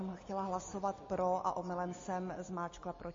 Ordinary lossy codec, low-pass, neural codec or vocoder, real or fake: MP3, 32 kbps; 7.2 kHz; none; real